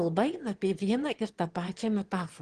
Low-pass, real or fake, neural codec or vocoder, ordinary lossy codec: 9.9 kHz; fake; autoencoder, 22.05 kHz, a latent of 192 numbers a frame, VITS, trained on one speaker; Opus, 16 kbps